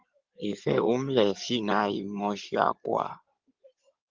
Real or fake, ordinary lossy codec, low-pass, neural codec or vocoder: fake; Opus, 32 kbps; 7.2 kHz; codec, 16 kHz in and 24 kHz out, 2.2 kbps, FireRedTTS-2 codec